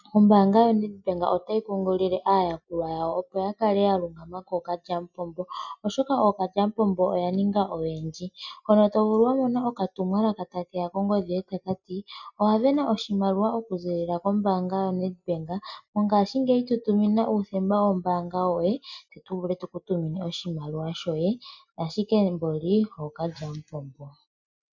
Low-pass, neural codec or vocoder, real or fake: 7.2 kHz; none; real